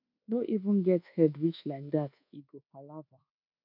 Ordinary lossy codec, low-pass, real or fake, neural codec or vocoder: none; 5.4 kHz; fake; codec, 24 kHz, 1.2 kbps, DualCodec